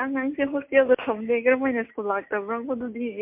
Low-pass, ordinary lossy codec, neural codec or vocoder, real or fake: 3.6 kHz; MP3, 32 kbps; none; real